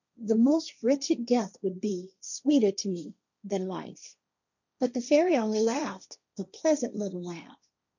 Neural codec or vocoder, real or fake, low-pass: codec, 16 kHz, 1.1 kbps, Voila-Tokenizer; fake; 7.2 kHz